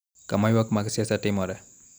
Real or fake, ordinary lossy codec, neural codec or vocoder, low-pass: real; none; none; none